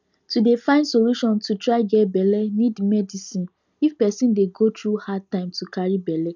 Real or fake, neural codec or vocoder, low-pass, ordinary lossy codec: real; none; 7.2 kHz; none